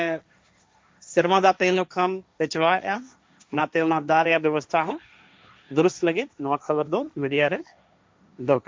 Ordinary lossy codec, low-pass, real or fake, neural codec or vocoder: none; none; fake; codec, 16 kHz, 1.1 kbps, Voila-Tokenizer